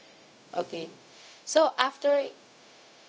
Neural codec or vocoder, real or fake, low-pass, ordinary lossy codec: codec, 16 kHz, 0.4 kbps, LongCat-Audio-Codec; fake; none; none